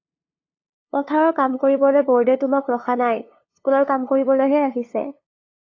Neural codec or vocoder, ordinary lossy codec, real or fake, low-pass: codec, 16 kHz, 2 kbps, FunCodec, trained on LibriTTS, 25 frames a second; AAC, 32 kbps; fake; 7.2 kHz